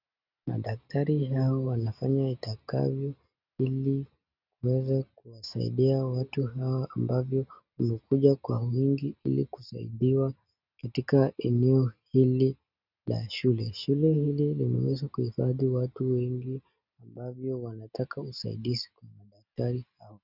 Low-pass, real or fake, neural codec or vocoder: 5.4 kHz; real; none